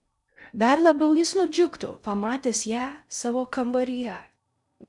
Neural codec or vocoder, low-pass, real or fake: codec, 16 kHz in and 24 kHz out, 0.6 kbps, FocalCodec, streaming, 2048 codes; 10.8 kHz; fake